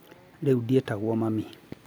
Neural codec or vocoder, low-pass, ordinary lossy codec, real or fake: none; none; none; real